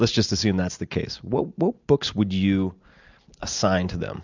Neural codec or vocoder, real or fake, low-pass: none; real; 7.2 kHz